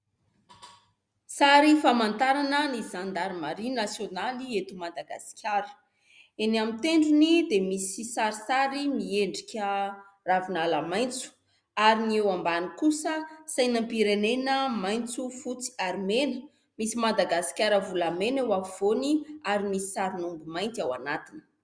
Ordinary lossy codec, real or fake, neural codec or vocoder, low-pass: Opus, 64 kbps; real; none; 9.9 kHz